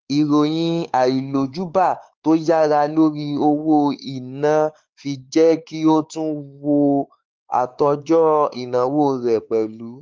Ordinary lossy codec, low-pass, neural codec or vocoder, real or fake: Opus, 24 kbps; 7.2 kHz; codec, 16 kHz, 4 kbps, X-Codec, WavLM features, trained on Multilingual LibriSpeech; fake